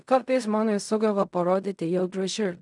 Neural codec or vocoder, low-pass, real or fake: codec, 16 kHz in and 24 kHz out, 0.4 kbps, LongCat-Audio-Codec, fine tuned four codebook decoder; 10.8 kHz; fake